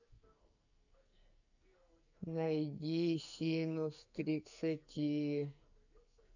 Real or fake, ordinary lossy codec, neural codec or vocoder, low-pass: fake; none; codec, 44.1 kHz, 2.6 kbps, SNAC; 7.2 kHz